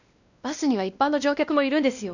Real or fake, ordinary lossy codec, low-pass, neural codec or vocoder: fake; none; 7.2 kHz; codec, 16 kHz, 1 kbps, X-Codec, WavLM features, trained on Multilingual LibriSpeech